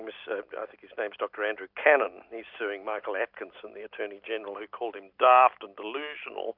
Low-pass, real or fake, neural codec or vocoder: 5.4 kHz; real; none